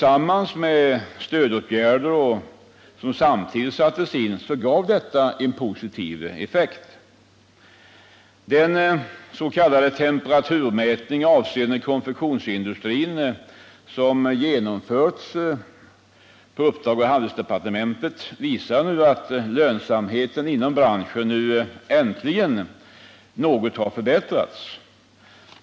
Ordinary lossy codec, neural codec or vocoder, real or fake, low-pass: none; none; real; none